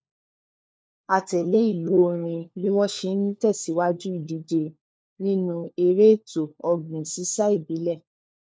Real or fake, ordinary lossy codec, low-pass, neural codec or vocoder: fake; none; none; codec, 16 kHz, 4 kbps, FunCodec, trained on LibriTTS, 50 frames a second